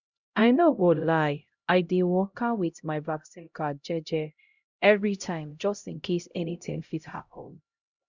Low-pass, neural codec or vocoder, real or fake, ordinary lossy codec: 7.2 kHz; codec, 16 kHz, 0.5 kbps, X-Codec, HuBERT features, trained on LibriSpeech; fake; Opus, 64 kbps